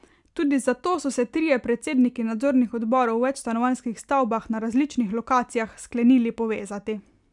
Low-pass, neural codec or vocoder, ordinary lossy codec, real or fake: 10.8 kHz; none; none; real